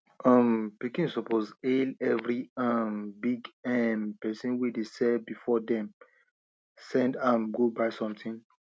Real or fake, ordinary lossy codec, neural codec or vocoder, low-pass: real; none; none; none